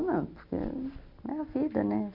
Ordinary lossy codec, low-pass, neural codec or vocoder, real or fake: none; 5.4 kHz; none; real